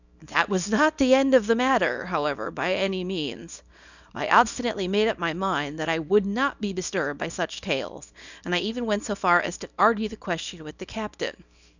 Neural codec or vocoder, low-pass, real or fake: codec, 24 kHz, 0.9 kbps, WavTokenizer, small release; 7.2 kHz; fake